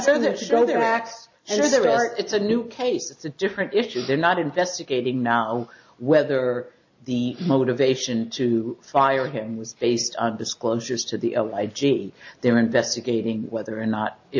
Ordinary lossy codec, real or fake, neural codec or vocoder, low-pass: AAC, 48 kbps; real; none; 7.2 kHz